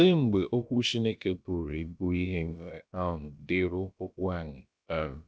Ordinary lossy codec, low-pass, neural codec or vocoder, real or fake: none; none; codec, 16 kHz, about 1 kbps, DyCAST, with the encoder's durations; fake